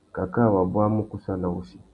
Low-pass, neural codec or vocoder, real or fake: 10.8 kHz; none; real